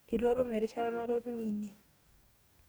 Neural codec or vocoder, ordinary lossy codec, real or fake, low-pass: codec, 44.1 kHz, 2.6 kbps, DAC; none; fake; none